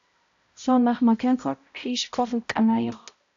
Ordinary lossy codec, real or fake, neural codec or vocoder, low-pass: AAC, 64 kbps; fake; codec, 16 kHz, 0.5 kbps, X-Codec, HuBERT features, trained on balanced general audio; 7.2 kHz